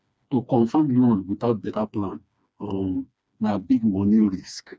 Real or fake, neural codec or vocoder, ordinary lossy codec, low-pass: fake; codec, 16 kHz, 2 kbps, FreqCodec, smaller model; none; none